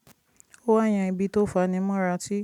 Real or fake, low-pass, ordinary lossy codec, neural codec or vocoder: real; 19.8 kHz; none; none